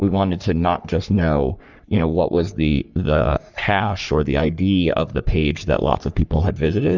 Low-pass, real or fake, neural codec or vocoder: 7.2 kHz; fake; codec, 44.1 kHz, 3.4 kbps, Pupu-Codec